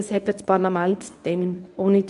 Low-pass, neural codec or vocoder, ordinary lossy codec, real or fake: 10.8 kHz; codec, 24 kHz, 0.9 kbps, WavTokenizer, medium speech release version 1; AAC, 96 kbps; fake